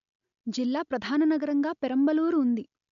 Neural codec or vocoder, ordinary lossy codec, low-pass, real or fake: none; none; 7.2 kHz; real